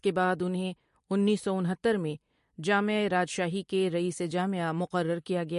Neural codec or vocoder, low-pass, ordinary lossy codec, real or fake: none; 10.8 kHz; MP3, 48 kbps; real